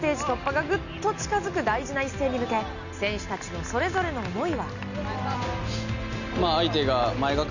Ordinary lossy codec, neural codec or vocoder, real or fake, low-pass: none; none; real; 7.2 kHz